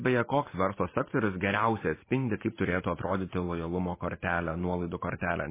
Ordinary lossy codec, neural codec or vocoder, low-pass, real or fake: MP3, 16 kbps; vocoder, 44.1 kHz, 128 mel bands every 512 samples, BigVGAN v2; 3.6 kHz; fake